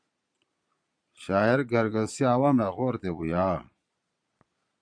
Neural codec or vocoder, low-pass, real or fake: vocoder, 22.05 kHz, 80 mel bands, Vocos; 9.9 kHz; fake